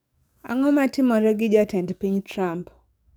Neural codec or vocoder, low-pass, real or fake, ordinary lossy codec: codec, 44.1 kHz, 7.8 kbps, DAC; none; fake; none